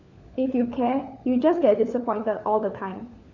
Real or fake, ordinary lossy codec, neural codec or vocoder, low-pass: fake; none; codec, 16 kHz, 4 kbps, FunCodec, trained on LibriTTS, 50 frames a second; 7.2 kHz